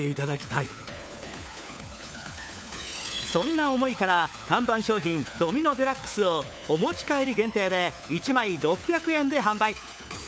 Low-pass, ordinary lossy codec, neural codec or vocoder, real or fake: none; none; codec, 16 kHz, 4 kbps, FunCodec, trained on LibriTTS, 50 frames a second; fake